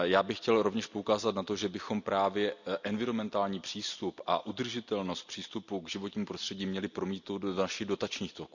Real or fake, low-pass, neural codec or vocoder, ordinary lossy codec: real; 7.2 kHz; none; none